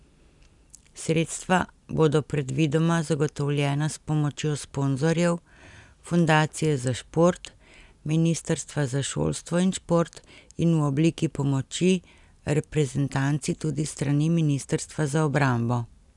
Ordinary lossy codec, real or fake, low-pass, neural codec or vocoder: none; real; 10.8 kHz; none